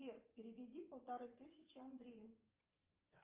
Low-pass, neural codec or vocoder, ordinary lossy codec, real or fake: 3.6 kHz; vocoder, 24 kHz, 100 mel bands, Vocos; Opus, 32 kbps; fake